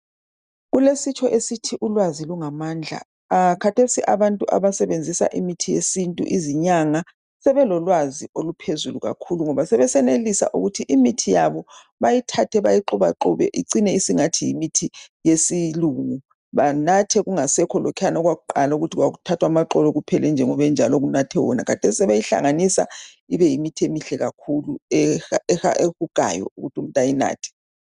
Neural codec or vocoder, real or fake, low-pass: none; real; 10.8 kHz